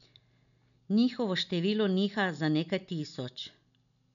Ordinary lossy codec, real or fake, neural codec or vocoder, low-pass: none; real; none; 7.2 kHz